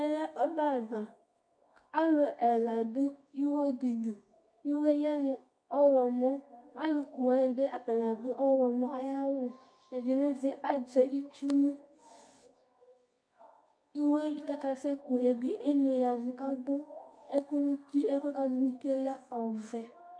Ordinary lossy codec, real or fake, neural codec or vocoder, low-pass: MP3, 64 kbps; fake; codec, 24 kHz, 0.9 kbps, WavTokenizer, medium music audio release; 9.9 kHz